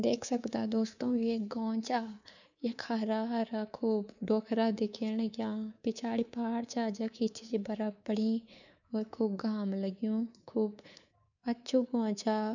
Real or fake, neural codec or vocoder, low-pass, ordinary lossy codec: fake; codec, 24 kHz, 3.1 kbps, DualCodec; 7.2 kHz; none